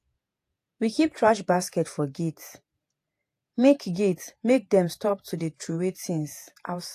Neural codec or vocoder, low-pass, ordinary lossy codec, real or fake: vocoder, 48 kHz, 128 mel bands, Vocos; 14.4 kHz; AAC, 64 kbps; fake